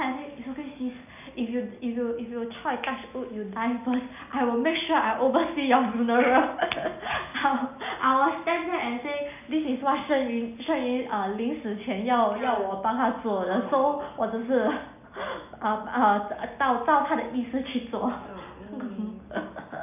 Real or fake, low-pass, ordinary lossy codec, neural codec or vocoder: real; 3.6 kHz; none; none